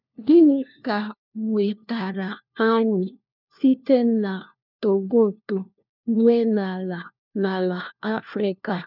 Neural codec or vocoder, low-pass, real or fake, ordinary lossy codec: codec, 16 kHz, 1 kbps, FunCodec, trained on LibriTTS, 50 frames a second; 5.4 kHz; fake; none